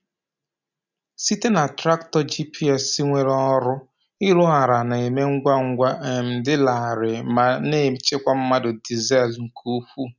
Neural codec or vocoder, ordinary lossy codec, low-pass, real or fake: none; none; 7.2 kHz; real